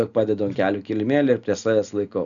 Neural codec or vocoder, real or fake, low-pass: none; real; 7.2 kHz